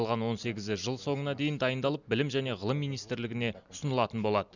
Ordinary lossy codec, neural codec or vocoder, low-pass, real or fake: none; none; 7.2 kHz; real